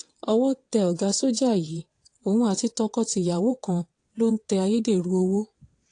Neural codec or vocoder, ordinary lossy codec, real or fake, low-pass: vocoder, 22.05 kHz, 80 mel bands, WaveNeXt; AAC, 48 kbps; fake; 9.9 kHz